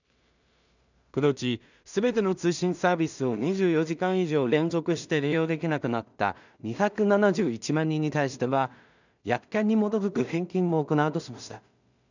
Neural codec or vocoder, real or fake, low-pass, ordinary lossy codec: codec, 16 kHz in and 24 kHz out, 0.4 kbps, LongCat-Audio-Codec, two codebook decoder; fake; 7.2 kHz; none